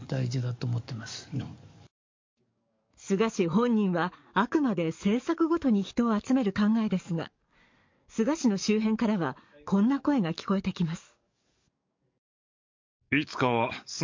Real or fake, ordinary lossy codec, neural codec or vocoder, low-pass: fake; MP3, 48 kbps; codec, 44.1 kHz, 7.8 kbps, DAC; 7.2 kHz